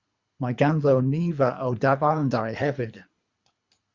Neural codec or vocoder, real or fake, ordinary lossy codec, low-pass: codec, 24 kHz, 3 kbps, HILCodec; fake; Opus, 64 kbps; 7.2 kHz